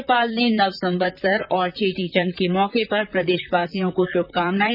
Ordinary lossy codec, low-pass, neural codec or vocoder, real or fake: none; 5.4 kHz; vocoder, 44.1 kHz, 128 mel bands, Pupu-Vocoder; fake